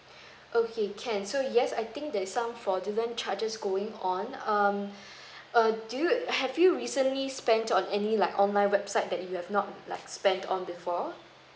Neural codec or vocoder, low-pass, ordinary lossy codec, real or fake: none; none; none; real